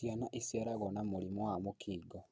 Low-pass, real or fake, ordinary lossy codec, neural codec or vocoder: none; real; none; none